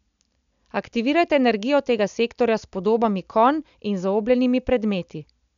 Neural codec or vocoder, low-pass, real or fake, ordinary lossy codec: none; 7.2 kHz; real; none